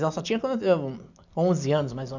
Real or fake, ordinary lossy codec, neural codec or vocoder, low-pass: real; none; none; 7.2 kHz